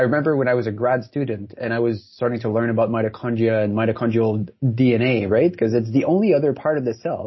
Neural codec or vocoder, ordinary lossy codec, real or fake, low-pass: none; MP3, 24 kbps; real; 7.2 kHz